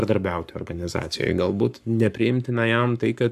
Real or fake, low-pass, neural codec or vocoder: fake; 14.4 kHz; codec, 44.1 kHz, 7.8 kbps, Pupu-Codec